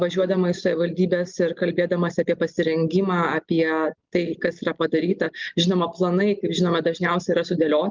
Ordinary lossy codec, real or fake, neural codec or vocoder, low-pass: Opus, 32 kbps; real; none; 7.2 kHz